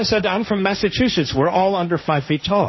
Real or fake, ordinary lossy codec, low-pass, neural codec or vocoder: fake; MP3, 24 kbps; 7.2 kHz; codec, 16 kHz, 1.1 kbps, Voila-Tokenizer